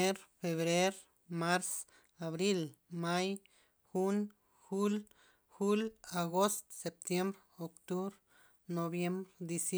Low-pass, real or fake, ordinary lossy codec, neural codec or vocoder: none; real; none; none